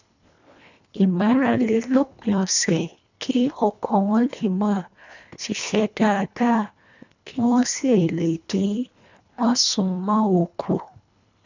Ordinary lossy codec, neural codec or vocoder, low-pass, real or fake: none; codec, 24 kHz, 1.5 kbps, HILCodec; 7.2 kHz; fake